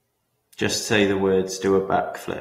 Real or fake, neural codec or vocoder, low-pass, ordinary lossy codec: real; none; 19.8 kHz; AAC, 48 kbps